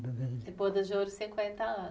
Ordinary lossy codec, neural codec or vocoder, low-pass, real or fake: none; none; none; real